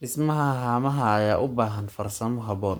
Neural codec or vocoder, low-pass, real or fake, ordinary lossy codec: codec, 44.1 kHz, 7.8 kbps, Pupu-Codec; none; fake; none